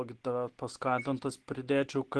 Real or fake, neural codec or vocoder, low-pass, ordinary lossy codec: real; none; 10.8 kHz; Opus, 16 kbps